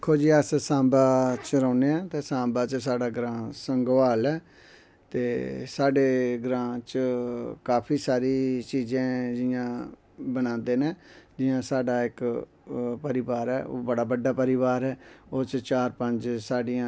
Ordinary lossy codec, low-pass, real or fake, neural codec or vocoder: none; none; real; none